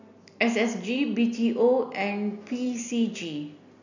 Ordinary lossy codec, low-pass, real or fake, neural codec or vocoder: none; 7.2 kHz; real; none